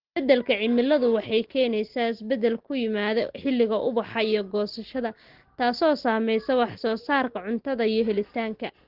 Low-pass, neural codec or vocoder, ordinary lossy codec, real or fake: 5.4 kHz; none; Opus, 16 kbps; real